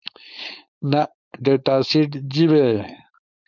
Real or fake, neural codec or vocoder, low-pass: fake; codec, 16 kHz, 4.8 kbps, FACodec; 7.2 kHz